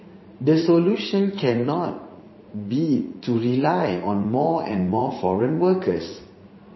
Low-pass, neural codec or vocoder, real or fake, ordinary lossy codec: 7.2 kHz; vocoder, 44.1 kHz, 80 mel bands, Vocos; fake; MP3, 24 kbps